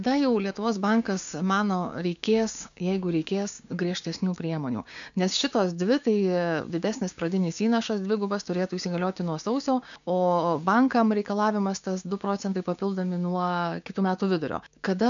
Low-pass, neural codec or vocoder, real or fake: 7.2 kHz; codec, 16 kHz, 6 kbps, DAC; fake